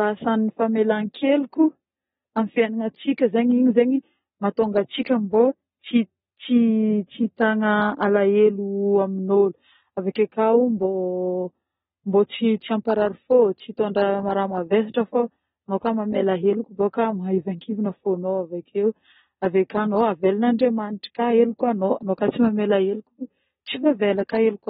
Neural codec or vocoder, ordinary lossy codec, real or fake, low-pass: none; AAC, 16 kbps; real; 10.8 kHz